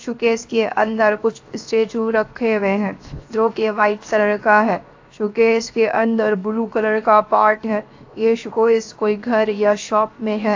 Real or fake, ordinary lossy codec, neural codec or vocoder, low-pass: fake; AAC, 48 kbps; codec, 16 kHz, 0.7 kbps, FocalCodec; 7.2 kHz